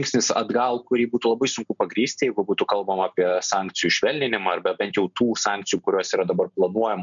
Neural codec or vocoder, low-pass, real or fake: none; 7.2 kHz; real